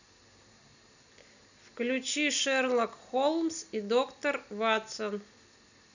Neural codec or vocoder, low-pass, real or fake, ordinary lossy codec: none; 7.2 kHz; real; none